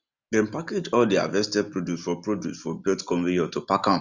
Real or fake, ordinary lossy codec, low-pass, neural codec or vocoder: real; none; 7.2 kHz; none